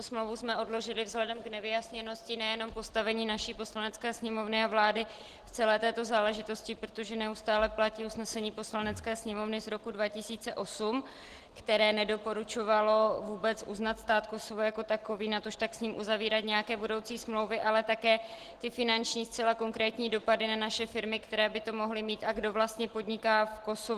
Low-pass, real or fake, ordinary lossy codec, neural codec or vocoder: 14.4 kHz; real; Opus, 16 kbps; none